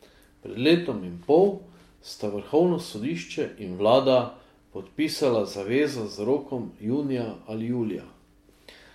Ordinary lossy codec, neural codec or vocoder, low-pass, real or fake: MP3, 64 kbps; none; 19.8 kHz; real